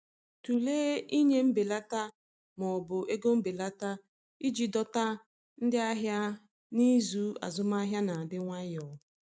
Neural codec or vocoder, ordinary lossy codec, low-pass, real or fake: none; none; none; real